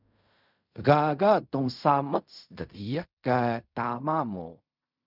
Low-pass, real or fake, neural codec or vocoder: 5.4 kHz; fake; codec, 16 kHz in and 24 kHz out, 0.4 kbps, LongCat-Audio-Codec, fine tuned four codebook decoder